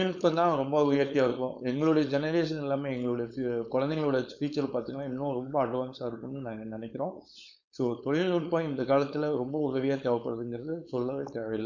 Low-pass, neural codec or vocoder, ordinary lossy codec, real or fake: 7.2 kHz; codec, 16 kHz, 4.8 kbps, FACodec; none; fake